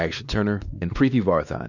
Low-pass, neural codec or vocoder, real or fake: 7.2 kHz; codec, 16 kHz, 2 kbps, X-Codec, WavLM features, trained on Multilingual LibriSpeech; fake